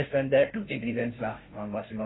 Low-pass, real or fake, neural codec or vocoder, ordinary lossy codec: 7.2 kHz; fake; codec, 16 kHz, 0.5 kbps, FunCodec, trained on Chinese and English, 25 frames a second; AAC, 16 kbps